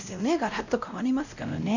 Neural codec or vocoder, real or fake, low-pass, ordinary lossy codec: codec, 16 kHz, 0.5 kbps, X-Codec, WavLM features, trained on Multilingual LibriSpeech; fake; 7.2 kHz; none